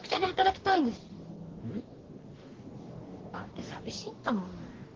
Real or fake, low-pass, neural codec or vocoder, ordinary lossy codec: fake; 7.2 kHz; codec, 44.1 kHz, 2.6 kbps, DAC; Opus, 16 kbps